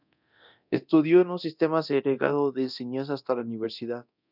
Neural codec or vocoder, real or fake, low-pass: codec, 24 kHz, 0.9 kbps, DualCodec; fake; 5.4 kHz